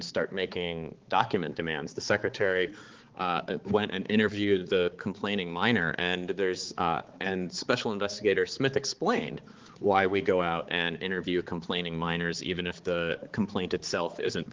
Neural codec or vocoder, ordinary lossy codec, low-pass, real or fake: codec, 16 kHz, 4 kbps, X-Codec, HuBERT features, trained on balanced general audio; Opus, 16 kbps; 7.2 kHz; fake